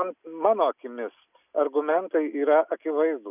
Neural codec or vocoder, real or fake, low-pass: none; real; 3.6 kHz